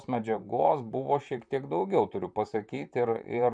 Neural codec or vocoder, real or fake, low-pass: vocoder, 44.1 kHz, 128 mel bands, Pupu-Vocoder; fake; 9.9 kHz